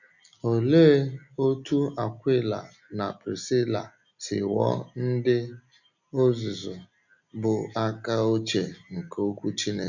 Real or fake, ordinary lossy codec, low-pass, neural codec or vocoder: real; none; 7.2 kHz; none